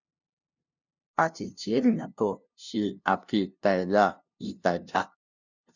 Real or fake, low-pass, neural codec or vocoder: fake; 7.2 kHz; codec, 16 kHz, 0.5 kbps, FunCodec, trained on LibriTTS, 25 frames a second